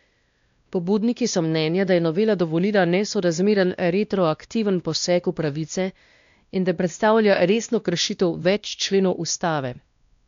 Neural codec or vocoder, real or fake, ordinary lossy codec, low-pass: codec, 16 kHz, 1 kbps, X-Codec, WavLM features, trained on Multilingual LibriSpeech; fake; MP3, 64 kbps; 7.2 kHz